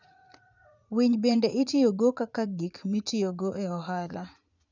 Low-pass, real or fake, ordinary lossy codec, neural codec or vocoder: 7.2 kHz; fake; none; vocoder, 44.1 kHz, 80 mel bands, Vocos